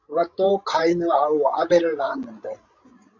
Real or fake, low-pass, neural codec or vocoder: fake; 7.2 kHz; codec, 16 kHz, 16 kbps, FreqCodec, larger model